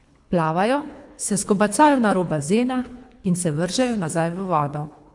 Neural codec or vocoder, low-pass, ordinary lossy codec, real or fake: codec, 24 kHz, 3 kbps, HILCodec; 10.8 kHz; AAC, 64 kbps; fake